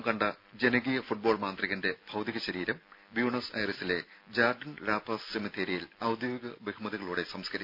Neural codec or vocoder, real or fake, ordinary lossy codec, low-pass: none; real; none; 5.4 kHz